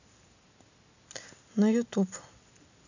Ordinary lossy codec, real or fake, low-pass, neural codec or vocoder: none; real; 7.2 kHz; none